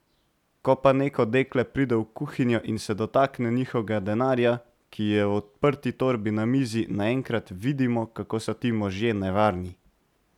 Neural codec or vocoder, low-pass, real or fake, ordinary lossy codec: none; 19.8 kHz; real; none